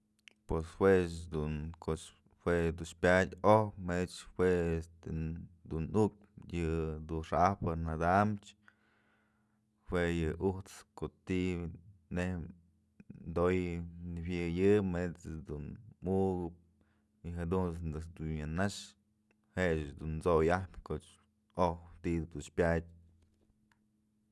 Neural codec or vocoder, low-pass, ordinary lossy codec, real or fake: none; none; none; real